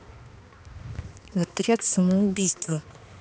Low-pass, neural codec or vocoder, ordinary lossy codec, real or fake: none; codec, 16 kHz, 2 kbps, X-Codec, HuBERT features, trained on balanced general audio; none; fake